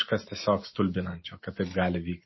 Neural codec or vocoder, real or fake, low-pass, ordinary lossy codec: none; real; 7.2 kHz; MP3, 24 kbps